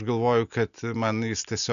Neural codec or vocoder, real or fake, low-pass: none; real; 7.2 kHz